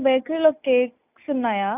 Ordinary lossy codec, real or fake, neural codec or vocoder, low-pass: none; real; none; 3.6 kHz